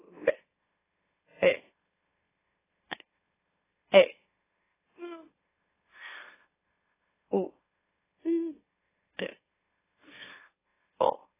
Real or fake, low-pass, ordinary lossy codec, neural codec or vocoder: fake; 3.6 kHz; AAC, 16 kbps; autoencoder, 44.1 kHz, a latent of 192 numbers a frame, MeloTTS